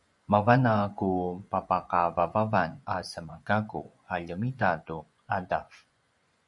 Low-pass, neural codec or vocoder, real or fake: 10.8 kHz; vocoder, 24 kHz, 100 mel bands, Vocos; fake